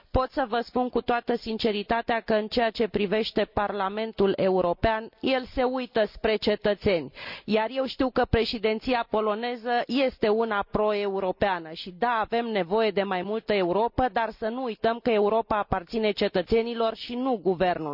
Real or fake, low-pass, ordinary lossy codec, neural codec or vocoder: real; 5.4 kHz; none; none